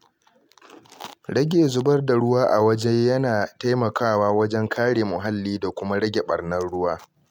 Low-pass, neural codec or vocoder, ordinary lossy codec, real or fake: 19.8 kHz; none; MP3, 96 kbps; real